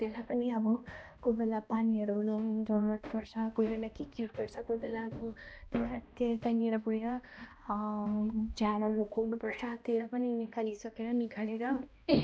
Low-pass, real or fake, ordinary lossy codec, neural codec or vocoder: none; fake; none; codec, 16 kHz, 1 kbps, X-Codec, HuBERT features, trained on balanced general audio